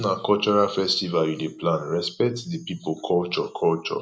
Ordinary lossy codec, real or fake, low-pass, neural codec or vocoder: none; real; none; none